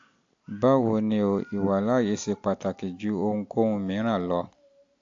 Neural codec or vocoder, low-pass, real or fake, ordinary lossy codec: none; 7.2 kHz; real; AAC, 48 kbps